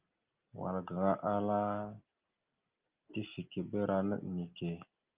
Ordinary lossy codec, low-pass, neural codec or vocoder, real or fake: Opus, 32 kbps; 3.6 kHz; none; real